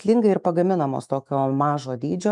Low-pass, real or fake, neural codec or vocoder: 10.8 kHz; fake; autoencoder, 48 kHz, 128 numbers a frame, DAC-VAE, trained on Japanese speech